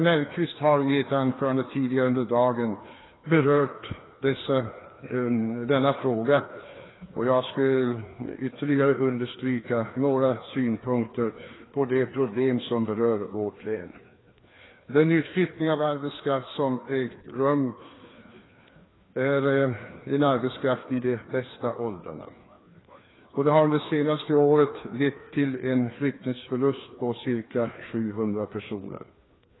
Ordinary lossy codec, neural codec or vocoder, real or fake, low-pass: AAC, 16 kbps; codec, 16 kHz, 2 kbps, FreqCodec, larger model; fake; 7.2 kHz